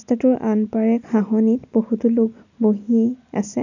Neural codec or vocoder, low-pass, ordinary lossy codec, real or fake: none; 7.2 kHz; none; real